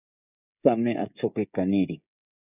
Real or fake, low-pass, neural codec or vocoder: fake; 3.6 kHz; codec, 16 kHz, 16 kbps, FreqCodec, smaller model